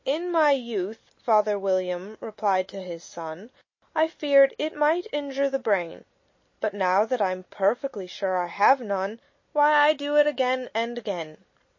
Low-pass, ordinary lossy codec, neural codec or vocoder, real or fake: 7.2 kHz; MP3, 32 kbps; none; real